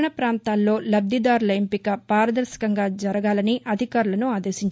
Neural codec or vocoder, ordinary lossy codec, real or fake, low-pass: none; none; real; none